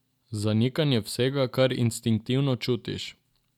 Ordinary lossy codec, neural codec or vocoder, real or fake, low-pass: none; none; real; 19.8 kHz